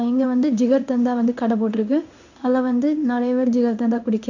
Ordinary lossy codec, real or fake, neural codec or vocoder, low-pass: none; fake; codec, 16 kHz in and 24 kHz out, 1 kbps, XY-Tokenizer; 7.2 kHz